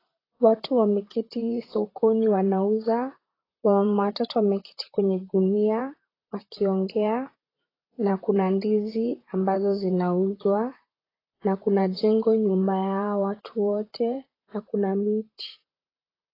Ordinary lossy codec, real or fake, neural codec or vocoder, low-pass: AAC, 24 kbps; fake; vocoder, 44.1 kHz, 128 mel bands, Pupu-Vocoder; 5.4 kHz